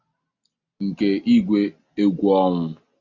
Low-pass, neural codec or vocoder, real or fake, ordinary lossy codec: 7.2 kHz; none; real; Opus, 64 kbps